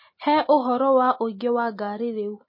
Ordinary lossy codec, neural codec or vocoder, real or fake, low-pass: MP3, 24 kbps; none; real; 5.4 kHz